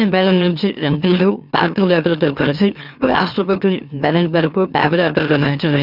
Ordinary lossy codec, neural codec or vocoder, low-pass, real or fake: none; autoencoder, 44.1 kHz, a latent of 192 numbers a frame, MeloTTS; 5.4 kHz; fake